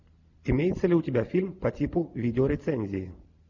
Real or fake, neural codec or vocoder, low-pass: real; none; 7.2 kHz